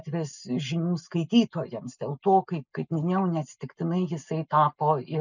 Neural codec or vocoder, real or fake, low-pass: none; real; 7.2 kHz